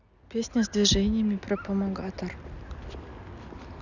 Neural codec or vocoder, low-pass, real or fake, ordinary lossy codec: none; 7.2 kHz; real; none